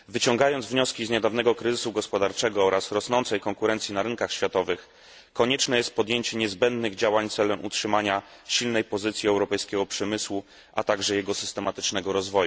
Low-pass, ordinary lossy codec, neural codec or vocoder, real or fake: none; none; none; real